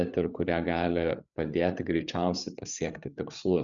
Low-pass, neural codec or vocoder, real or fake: 7.2 kHz; codec, 16 kHz, 8 kbps, FreqCodec, larger model; fake